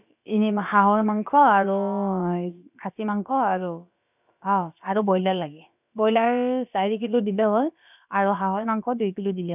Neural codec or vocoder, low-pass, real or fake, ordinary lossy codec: codec, 16 kHz, about 1 kbps, DyCAST, with the encoder's durations; 3.6 kHz; fake; none